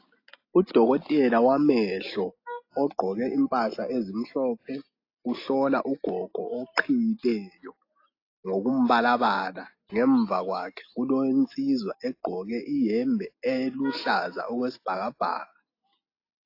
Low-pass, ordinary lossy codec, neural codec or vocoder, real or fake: 5.4 kHz; AAC, 32 kbps; none; real